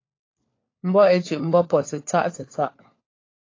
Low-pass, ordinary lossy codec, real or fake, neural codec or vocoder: 7.2 kHz; AAC, 32 kbps; fake; codec, 16 kHz, 4 kbps, FunCodec, trained on LibriTTS, 50 frames a second